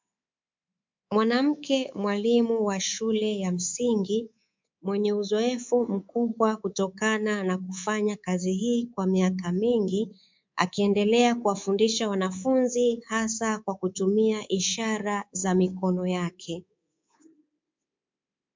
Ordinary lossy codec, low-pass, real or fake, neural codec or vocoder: MP3, 64 kbps; 7.2 kHz; fake; codec, 24 kHz, 3.1 kbps, DualCodec